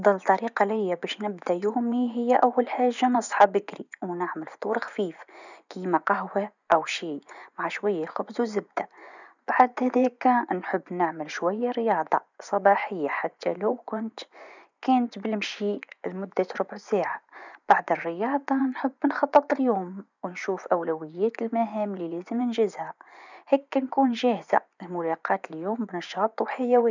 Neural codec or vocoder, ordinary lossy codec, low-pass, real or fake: vocoder, 24 kHz, 100 mel bands, Vocos; none; 7.2 kHz; fake